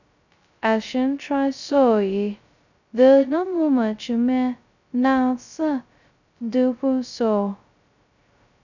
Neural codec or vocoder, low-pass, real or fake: codec, 16 kHz, 0.2 kbps, FocalCodec; 7.2 kHz; fake